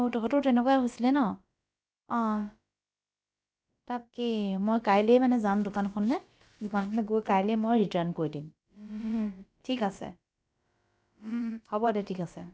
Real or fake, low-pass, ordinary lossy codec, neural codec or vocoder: fake; none; none; codec, 16 kHz, about 1 kbps, DyCAST, with the encoder's durations